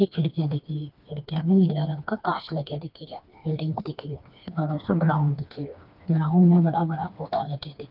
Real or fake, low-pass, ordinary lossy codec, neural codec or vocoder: fake; 5.4 kHz; Opus, 24 kbps; codec, 16 kHz, 2 kbps, FreqCodec, smaller model